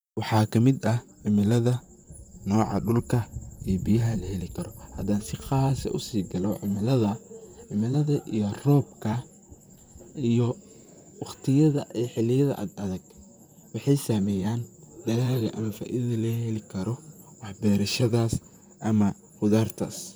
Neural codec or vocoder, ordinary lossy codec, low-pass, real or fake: vocoder, 44.1 kHz, 128 mel bands, Pupu-Vocoder; none; none; fake